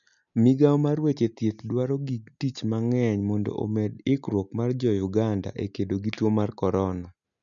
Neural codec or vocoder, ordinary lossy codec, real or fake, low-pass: none; none; real; 7.2 kHz